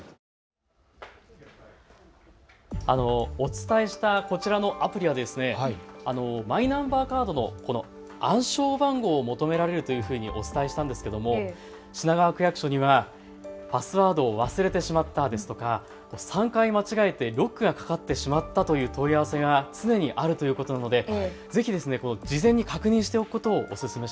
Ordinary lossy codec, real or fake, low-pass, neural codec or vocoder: none; real; none; none